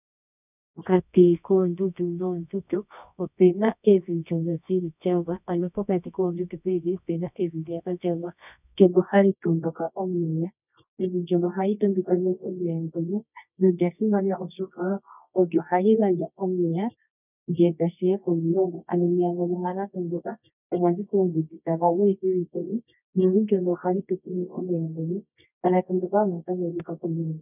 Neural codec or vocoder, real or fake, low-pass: codec, 24 kHz, 0.9 kbps, WavTokenizer, medium music audio release; fake; 3.6 kHz